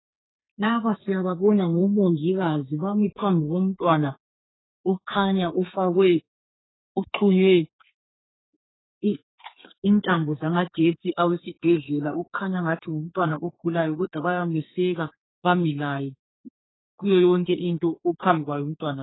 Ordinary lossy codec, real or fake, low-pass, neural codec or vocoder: AAC, 16 kbps; fake; 7.2 kHz; codec, 32 kHz, 1.9 kbps, SNAC